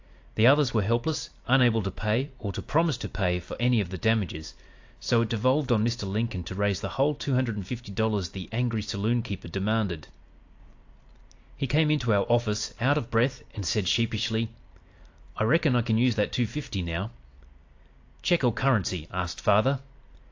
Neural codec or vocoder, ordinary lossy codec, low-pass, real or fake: none; AAC, 48 kbps; 7.2 kHz; real